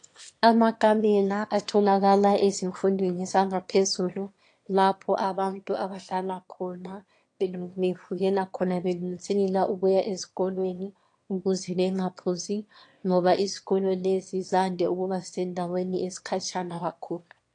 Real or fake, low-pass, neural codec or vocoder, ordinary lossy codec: fake; 9.9 kHz; autoencoder, 22.05 kHz, a latent of 192 numbers a frame, VITS, trained on one speaker; AAC, 48 kbps